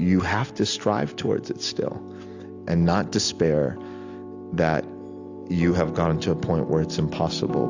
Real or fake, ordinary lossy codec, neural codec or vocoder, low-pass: real; AAC, 48 kbps; none; 7.2 kHz